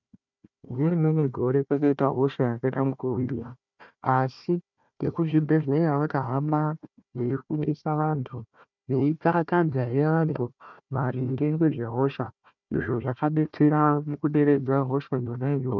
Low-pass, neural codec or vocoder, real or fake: 7.2 kHz; codec, 16 kHz, 1 kbps, FunCodec, trained on Chinese and English, 50 frames a second; fake